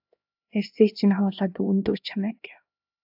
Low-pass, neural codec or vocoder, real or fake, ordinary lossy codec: 5.4 kHz; codec, 16 kHz, 1 kbps, X-Codec, HuBERT features, trained on LibriSpeech; fake; AAC, 48 kbps